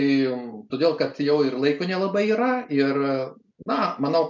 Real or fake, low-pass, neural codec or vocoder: real; 7.2 kHz; none